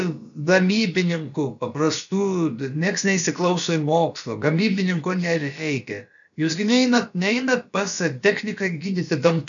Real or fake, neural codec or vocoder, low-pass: fake; codec, 16 kHz, about 1 kbps, DyCAST, with the encoder's durations; 7.2 kHz